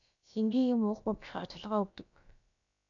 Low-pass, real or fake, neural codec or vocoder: 7.2 kHz; fake; codec, 16 kHz, about 1 kbps, DyCAST, with the encoder's durations